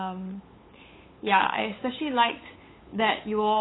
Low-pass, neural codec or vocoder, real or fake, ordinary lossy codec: 7.2 kHz; codec, 16 kHz, 8 kbps, FunCodec, trained on LibriTTS, 25 frames a second; fake; AAC, 16 kbps